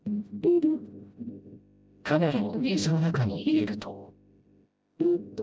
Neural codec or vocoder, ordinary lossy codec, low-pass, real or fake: codec, 16 kHz, 0.5 kbps, FreqCodec, smaller model; none; none; fake